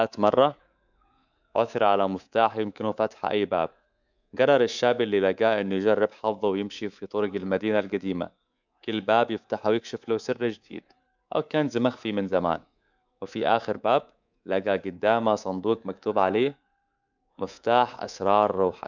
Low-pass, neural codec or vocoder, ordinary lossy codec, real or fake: 7.2 kHz; codec, 24 kHz, 3.1 kbps, DualCodec; none; fake